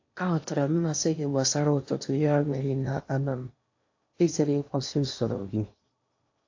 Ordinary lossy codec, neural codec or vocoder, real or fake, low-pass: AAC, 48 kbps; codec, 16 kHz in and 24 kHz out, 0.8 kbps, FocalCodec, streaming, 65536 codes; fake; 7.2 kHz